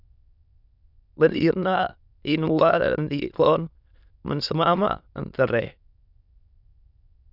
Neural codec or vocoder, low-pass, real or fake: autoencoder, 22.05 kHz, a latent of 192 numbers a frame, VITS, trained on many speakers; 5.4 kHz; fake